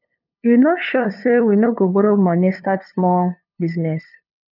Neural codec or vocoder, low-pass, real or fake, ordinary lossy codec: codec, 16 kHz, 2 kbps, FunCodec, trained on LibriTTS, 25 frames a second; 5.4 kHz; fake; none